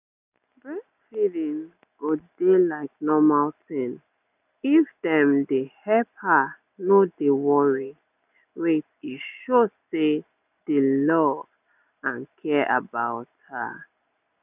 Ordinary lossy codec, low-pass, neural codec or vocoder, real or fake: none; 3.6 kHz; none; real